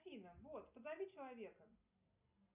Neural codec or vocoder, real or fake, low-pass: none; real; 3.6 kHz